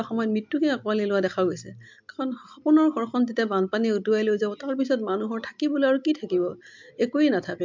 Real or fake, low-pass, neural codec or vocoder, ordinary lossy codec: real; 7.2 kHz; none; MP3, 64 kbps